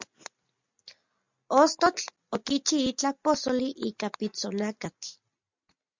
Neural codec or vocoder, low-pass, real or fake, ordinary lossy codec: none; 7.2 kHz; real; MP3, 64 kbps